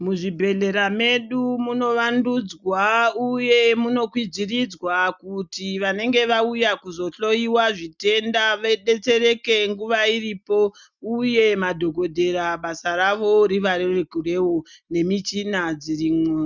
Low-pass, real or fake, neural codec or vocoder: 7.2 kHz; real; none